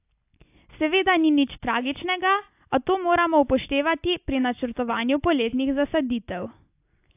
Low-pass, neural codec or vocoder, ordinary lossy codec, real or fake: 3.6 kHz; none; AAC, 32 kbps; real